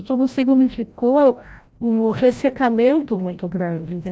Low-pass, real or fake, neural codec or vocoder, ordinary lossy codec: none; fake; codec, 16 kHz, 0.5 kbps, FreqCodec, larger model; none